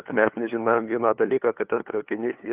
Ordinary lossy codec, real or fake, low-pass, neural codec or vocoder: Opus, 24 kbps; fake; 3.6 kHz; codec, 16 kHz, 2 kbps, FunCodec, trained on LibriTTS, 25 frames a second